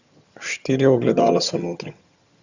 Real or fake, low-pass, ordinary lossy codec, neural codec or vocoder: fake; 7.2 kHz; Opus, 64 kbps; vocoder, 22.05 kHz, 80 mel bands, HiFi-GAN